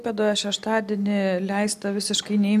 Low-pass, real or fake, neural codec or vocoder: 14.4 kHz; real; none